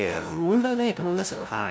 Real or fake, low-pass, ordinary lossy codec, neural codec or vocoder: fake; none; none; codec, 16 kHz, 0.5 kbps, FunCodec, trained on LibriTTS, 25 frames a second